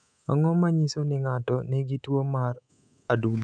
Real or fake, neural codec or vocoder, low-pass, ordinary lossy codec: fake; codec, 24 kHz, 3.1 kbps, DualCodec; 9.9 kHz; none